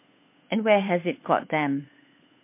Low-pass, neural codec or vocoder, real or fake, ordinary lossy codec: 3.6 kHz; codec, 16 kHz, 8 kbps, FunCodec, trained on Chinese and English, 25 frames a second; fake; MP3, 24 kbps